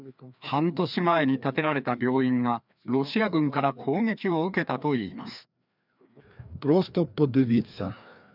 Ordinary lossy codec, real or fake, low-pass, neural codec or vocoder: none; fake; 5.4 kHz; codec, 16 kHz, 2 kbps, FreqCodec, larger model